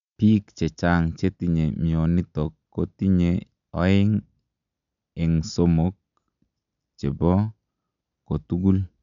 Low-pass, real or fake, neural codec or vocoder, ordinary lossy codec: 7.2 kHz; real; none; none